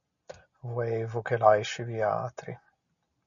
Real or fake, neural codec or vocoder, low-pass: real; none; 7.2 kHz